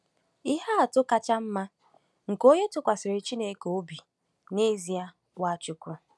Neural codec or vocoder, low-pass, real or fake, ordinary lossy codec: none; none; real; none